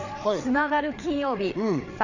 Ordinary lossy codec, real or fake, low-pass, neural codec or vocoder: none; fake; 7.2 kHz; codec, 16 kHz, 4 kbps, FreqCodec, larger model